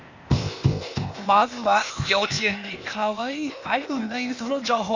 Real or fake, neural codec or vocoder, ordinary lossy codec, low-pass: fake; codec, 16 kHz, 0.8 kbps, ZipCodec; Opus, 64 kbps; 7.2 kHz